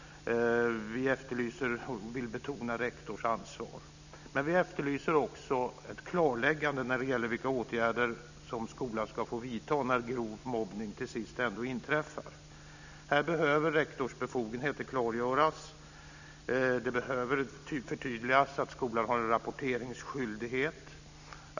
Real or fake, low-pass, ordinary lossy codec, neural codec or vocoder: real; 7.2 kHz; none; none